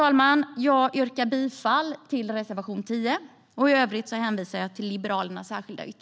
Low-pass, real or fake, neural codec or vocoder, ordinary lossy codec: none; real; none; none